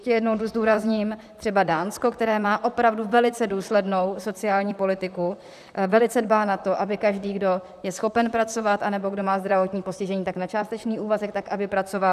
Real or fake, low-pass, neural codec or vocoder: fake; 14.4 kHz; vocoder, 44.1 kHz, 128 mel bands, Pupu-Vocoder